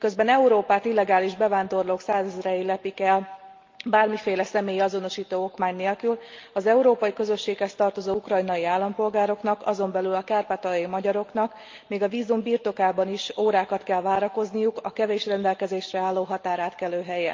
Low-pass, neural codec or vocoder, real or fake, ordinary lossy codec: 7.2 kHz; none; real; Opus, 24 kbps